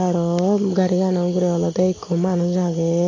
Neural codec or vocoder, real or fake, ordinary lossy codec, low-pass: none; real; AAC, 32 kbps; 7.2 kHz